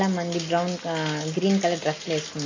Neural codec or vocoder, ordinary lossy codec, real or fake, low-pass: none; MP3, 32 kbps; real; 7.2 kHz